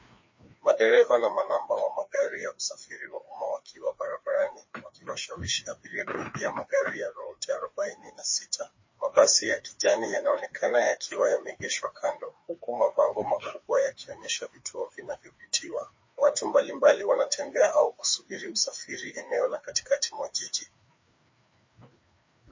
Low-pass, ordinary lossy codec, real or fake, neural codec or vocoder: 7.2 kHz; MP3, 32 kbps; fake; codec, 16 kHz, 2 kbps, FreqCodec, larger model